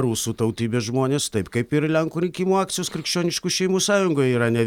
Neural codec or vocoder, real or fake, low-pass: none; real; 19.8 kHz